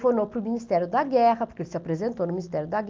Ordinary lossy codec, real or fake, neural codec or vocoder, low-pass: Opus, 32 kbps; real; none; 7.2 kHz